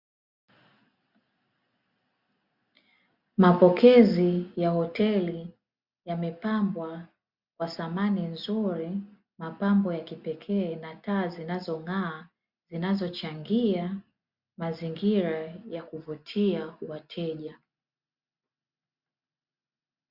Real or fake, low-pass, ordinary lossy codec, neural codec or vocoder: real; 5.4 kHz; Opus, 64 kbps; none